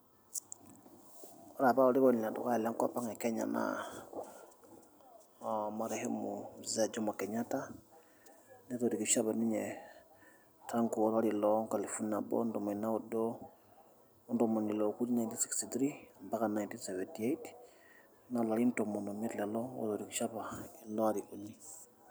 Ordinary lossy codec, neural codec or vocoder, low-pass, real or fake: none; none; none; real